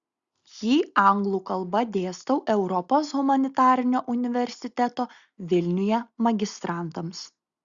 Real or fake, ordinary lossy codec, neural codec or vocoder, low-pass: real; Opus, 64 kbps; none; 7.2 kHz